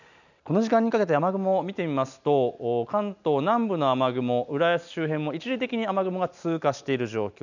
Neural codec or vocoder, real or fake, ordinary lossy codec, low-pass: none; real; none; 7.2 kHz